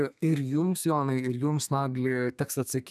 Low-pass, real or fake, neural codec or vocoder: 14.4 kHz; fake; codec, 32 kHz, 1.9 kbps, SNAC